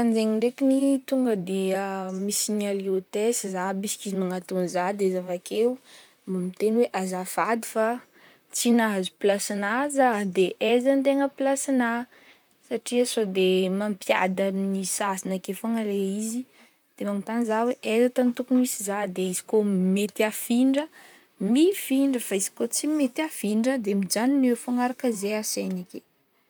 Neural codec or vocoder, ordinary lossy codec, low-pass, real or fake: vocoder, 44.1 kHz, 128 mel bands, Pupu-Vocoder; none; none; fake